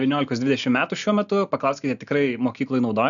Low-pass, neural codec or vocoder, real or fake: 7.2 kHz; none; real